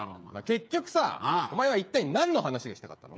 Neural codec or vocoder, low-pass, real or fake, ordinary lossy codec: codec, 16 kHz, 8 kbps, FreqCodec, smaller model; none; fake; none